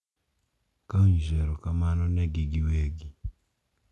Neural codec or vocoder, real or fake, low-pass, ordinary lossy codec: none; real; none; none